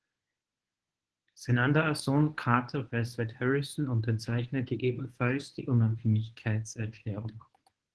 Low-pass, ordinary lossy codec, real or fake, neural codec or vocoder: 10.8 kHz; Opus, 16 kbps; fake; codec, 24 kHz, 0.9 kbps, WavTokenizer, medium speech release version 1